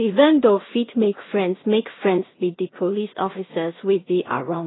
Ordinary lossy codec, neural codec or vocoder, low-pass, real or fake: AAC, 16 kbps; codec, 16 kHz in and 24 kHz out, 0.4 kbps, LongCat-Audio-Codec, two codebook decoder; 7.2 kHz; fake